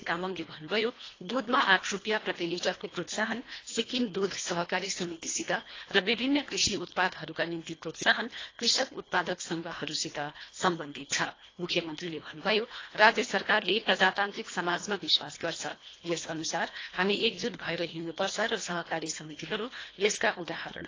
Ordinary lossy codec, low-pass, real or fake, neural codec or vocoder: AAC, 32 kbps; 7.2 kHz; fake; codec, 24 kHz, 1.5 kbps, HILCodec